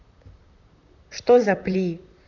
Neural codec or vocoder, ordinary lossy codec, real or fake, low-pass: vocoder, 44.1 kHz, 128 mel bands, Pupu-Vocoder; none; fake; 7.2 kHz